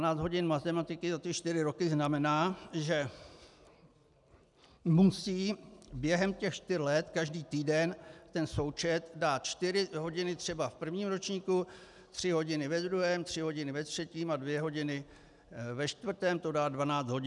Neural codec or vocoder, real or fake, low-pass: none; real; 10.8 kHz